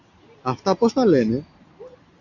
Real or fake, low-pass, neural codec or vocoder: real; 7.2 kHz; none